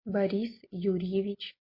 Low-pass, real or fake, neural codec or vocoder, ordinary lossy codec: 5.4 kHz; real; none; MP3, 32 kbps